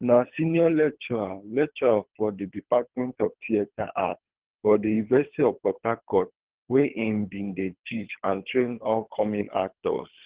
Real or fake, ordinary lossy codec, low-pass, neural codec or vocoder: fake; Opus, 16 kbps; 3.6 kHz; codec, 24 kHz, 3 kbps, HILCodec